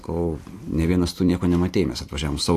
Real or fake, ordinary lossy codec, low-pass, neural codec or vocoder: real; AAC, 64 kbps; 14.4 kHz; none